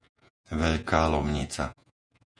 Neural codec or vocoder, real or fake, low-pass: vocoder, 48 kHz, 128 mel bands, Vocos; fake; 9.9 kHz